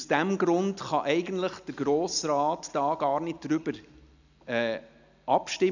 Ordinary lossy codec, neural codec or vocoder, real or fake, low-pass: none; none; real; 7.2 kHz